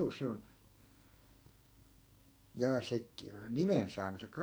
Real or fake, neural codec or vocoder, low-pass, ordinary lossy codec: fake; codec, 44.1 kHz, 2.6 kbps, SNAC; none; none